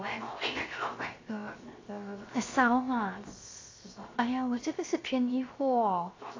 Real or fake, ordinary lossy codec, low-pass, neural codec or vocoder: fake; AAC, 32 kbps; 7.2 kHz; codec, 16 kHz, 0.7 kbps, FocalCodec